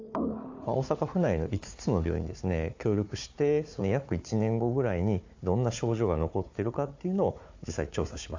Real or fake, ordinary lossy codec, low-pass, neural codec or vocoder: fake; AAC, 48 kbps; 7.2 kHz; codec, 16 kHz, 4 kbps, FunCodec, trained on Chinese and English, 50 frames a second